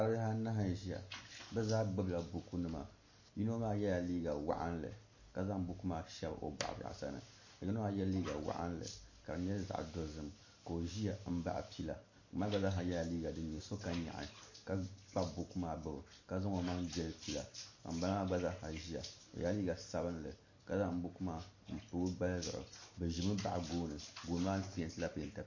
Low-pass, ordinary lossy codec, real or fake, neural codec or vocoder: 7.2 kHz; MP3, 32 kbps; real; none